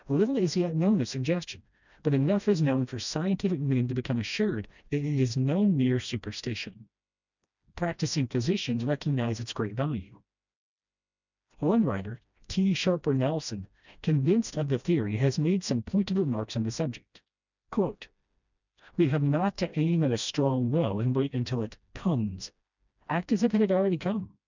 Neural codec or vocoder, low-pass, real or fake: codec, 16 kHz, 1 kbps, FreqCodec, smaller model; 7.2 kHz; fake